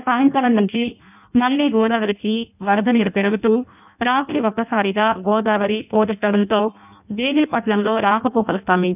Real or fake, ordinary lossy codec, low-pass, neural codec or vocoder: fake; none; 3.6 kHz; codec, 16 kHz in and 24 kHz out, 0.6 kbps, FireRedTTS-2 codec